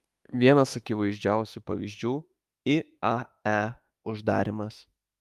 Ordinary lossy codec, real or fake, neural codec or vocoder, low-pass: Opus, 32 kbps; fake; autoencoder, 48 kHz, 32 numbers a frame, DAC-VAE, trained on Japanese speech; 14.4 kHz